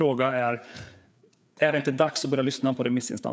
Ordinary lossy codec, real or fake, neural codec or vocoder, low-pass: none; fake; codec, 16 kHz, 4 kbps, FreqCodec, larger model; none